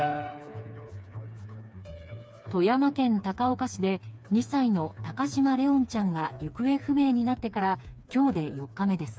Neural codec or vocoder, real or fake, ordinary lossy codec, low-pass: codec, 16 kHz, 4 kbps, FreqCodec, smaller model; fake; none; none